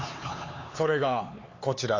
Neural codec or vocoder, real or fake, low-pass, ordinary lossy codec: codec, 16 kHz, 4 kbps, X-Codec, HuBERT features, trained on LibriSpeech; fake; 7.2 kHz; AAC, 32 kbps